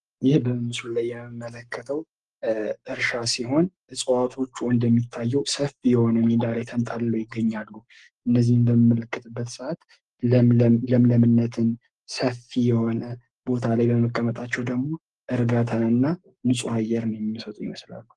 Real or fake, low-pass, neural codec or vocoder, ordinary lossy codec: fake; 10.8 kHz; codec, 44.1 kHz, 7.8 kbps, Pupu-Codec; Opus, 24 kbps